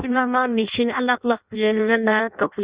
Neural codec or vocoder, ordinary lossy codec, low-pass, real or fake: codec, 16 kHz in and 24 kHz out, 0.6 kbps, FireRedTTS-2 codec; Opus, 64 kbps; 3.6 kHz; fake